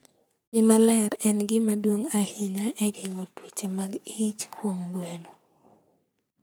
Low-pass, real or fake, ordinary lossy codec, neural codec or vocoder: none; fake; none; codec, 44.1 kHz, 3.4 kbps, Pupu-Codec